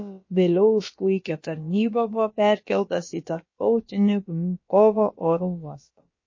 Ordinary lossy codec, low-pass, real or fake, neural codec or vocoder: MP3, 32 kbps; 7.2 kHz; fake; codec, 16 kHz, about 1 kbps, DyCAST, with the encoder's durations